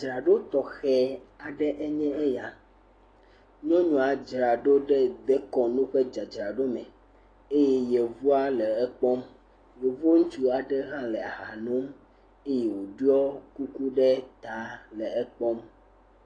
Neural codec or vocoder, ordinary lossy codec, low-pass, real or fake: none; AAC, 32 kbps; 9.9 kHz; real